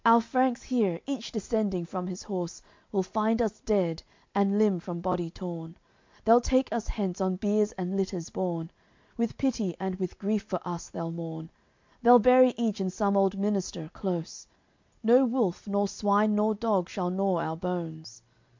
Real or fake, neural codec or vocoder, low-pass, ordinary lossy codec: real; none; 7.2 kHz; MP3, 64 kbps